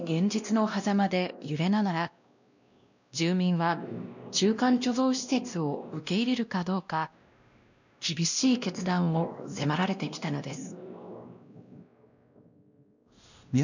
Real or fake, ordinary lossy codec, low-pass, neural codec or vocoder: fake; none; 7.2 kHz; codec, 16 kHz, 1 kbps, X-Codec, WavLM features, trained on Multilingual LibriSpeech